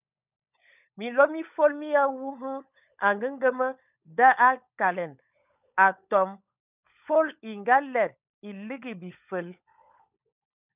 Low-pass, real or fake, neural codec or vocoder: 3.6 kHz; fake; codec, 16 kHz, 16 kbps, FunCodec, trained on LibriTTS, 50 frames a second